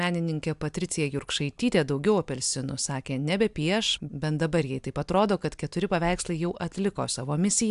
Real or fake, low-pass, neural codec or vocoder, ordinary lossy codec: real; 10.8 kHz; none; AAC, 96 kbps